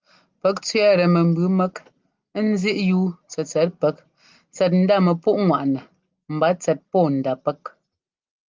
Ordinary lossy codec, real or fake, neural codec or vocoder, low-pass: Opus, 32 kbps; real; none; 7.2 kHz